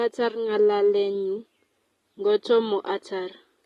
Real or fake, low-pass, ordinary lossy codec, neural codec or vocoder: real; 19.8 kHz; AAC, 32 kbps; none